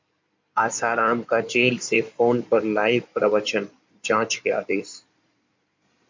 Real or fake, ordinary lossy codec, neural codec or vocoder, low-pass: fake; MP3, 64 kbps; codec, 16 kHz in and 24 kHz out, 2.2 kbps, FireRedTTS-2 codec; 7.2 kHz